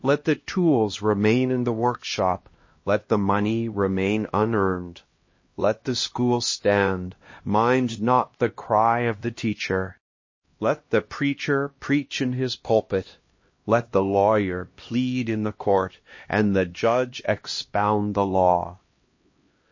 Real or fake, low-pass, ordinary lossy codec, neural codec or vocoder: fake; 7.2 kHz; MP3, 32 kbps; codec, 16 kHz, 1 kbps, X-Codec, HuBERT features, trained on LibriSpeech